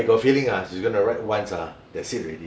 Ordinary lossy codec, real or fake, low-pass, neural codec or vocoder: none; real; none; none